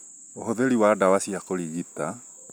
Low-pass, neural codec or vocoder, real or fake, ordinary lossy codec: none; none; real; none